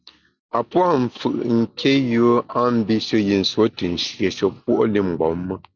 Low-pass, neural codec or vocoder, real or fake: 7.2 kHz; none; real